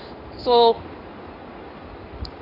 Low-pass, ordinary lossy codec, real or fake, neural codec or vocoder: 5.4 kHz; none; fake; codec, 16 kHz in and 24 kHz out, 1 kbps, XY-Tokenizer